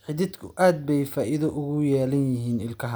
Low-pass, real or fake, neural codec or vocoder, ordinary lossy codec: none; real; none; none